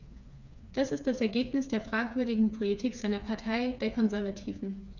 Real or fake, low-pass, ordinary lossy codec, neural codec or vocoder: fake; 7.2 kHz; none; codec, 16 kHz, 4 kbps, FreqCodec, smaller model